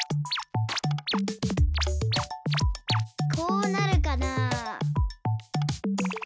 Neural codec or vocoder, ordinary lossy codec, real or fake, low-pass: none; none; real; none